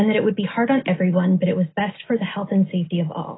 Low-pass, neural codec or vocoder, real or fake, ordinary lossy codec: 7.2 kHz; none; real; AAC, 16 kbps